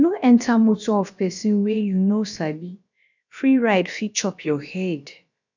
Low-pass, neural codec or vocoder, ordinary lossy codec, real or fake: 7.2 kHz; codec, 16 kHz, about 1 kbps, DyCAST, with the encoder's durations; none; fake